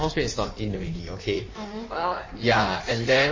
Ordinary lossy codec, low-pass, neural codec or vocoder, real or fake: MP3, 32 kbps; 7.2 kHz; codec, 16 kHz in and 24 kHz out, 1.1 kbps, FireRedTTS-2 codec; fake